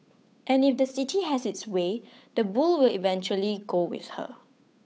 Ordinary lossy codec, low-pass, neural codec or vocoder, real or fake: none; none; codec, 16 kHz, 8 kbps, FunCodec, trained on Chinese and English, 25 frames a second; fake